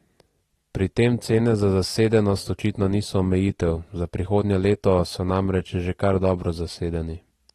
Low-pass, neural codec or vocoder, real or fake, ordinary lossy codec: 19.8 kHz; none; real; AAC, 32 kbps